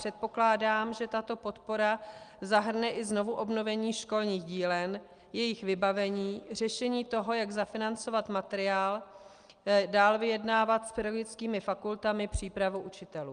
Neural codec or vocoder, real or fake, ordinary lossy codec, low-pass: none; real; Opus, 32 kbps; 9.9 kHz